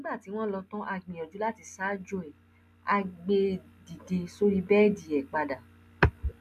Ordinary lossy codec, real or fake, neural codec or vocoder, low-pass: MP3, 96 kbps; real; none; 14.4 kHz